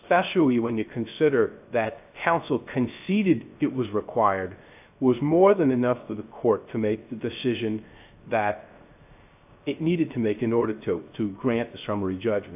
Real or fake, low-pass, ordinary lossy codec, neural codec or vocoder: fake; 3.6 kHz; AAC, 32 kbps; codec, 16 kHz, 0.3 kbps, FocalCodec